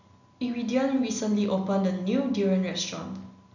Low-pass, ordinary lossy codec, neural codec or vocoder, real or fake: 7.2 kHz; none; none; real